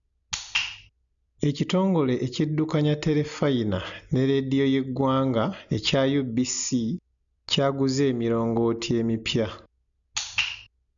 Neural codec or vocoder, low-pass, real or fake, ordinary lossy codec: none; 7.2 kHz; real; none